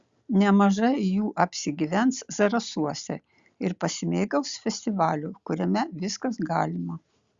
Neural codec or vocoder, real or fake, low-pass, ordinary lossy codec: none; real; 7.2 kHz; Opus, 64 kbps